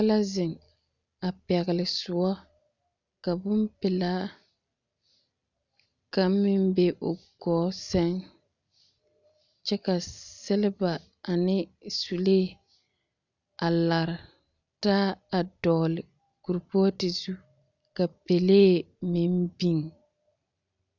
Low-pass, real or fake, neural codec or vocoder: 7.2 kHz; real; none